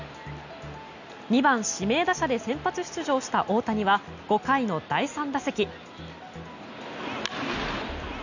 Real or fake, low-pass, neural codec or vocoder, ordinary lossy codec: real; 7.2 kHz; none; none